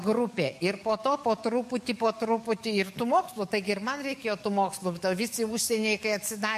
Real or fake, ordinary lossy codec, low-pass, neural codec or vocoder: fake; MP3, 64 kbps; 14.4 kHz; codec, 44.1 kHz, 7.8 kbps, DAC